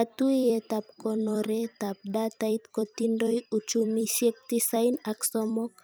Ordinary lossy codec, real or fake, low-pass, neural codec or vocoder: none; fake; none; vocoder, 44.1 kHz, 128 mel bands every 256 samples, BigVGAN v2